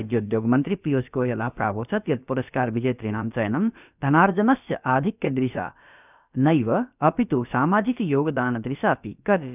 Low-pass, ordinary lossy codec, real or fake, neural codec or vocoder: 3.6 kHz; none; fake; codec, 16 kHz, about 1 kbps, DyCAST, with the encoder's durations